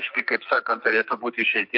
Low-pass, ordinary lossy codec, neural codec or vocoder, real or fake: 5.4 kHz; MP3, 48 kbps; codec, 44.1 kHz, 2.6 kbps, SNAC; fake